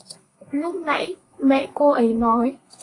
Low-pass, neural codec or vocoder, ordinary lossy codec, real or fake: 10.8 kHz; codec, 32 kHz, 1.9 kbps, SNAC; AAC, 32 kbps; fake